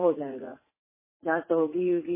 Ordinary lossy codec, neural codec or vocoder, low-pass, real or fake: MP3, 16 kbps; vocoder, 44.1 kHz, 80 mel bands, Vocos; 3.6 kHz; fake